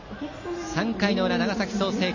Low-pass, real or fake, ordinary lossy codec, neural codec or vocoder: 7.2 kHz; real; MP3, 32 kbps; none